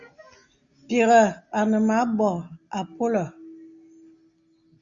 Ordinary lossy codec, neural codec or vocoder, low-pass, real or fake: Opus, 64 kbps; none; 7.2 kHz; real